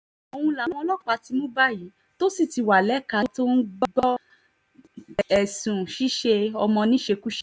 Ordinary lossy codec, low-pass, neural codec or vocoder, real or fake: none; none; none; real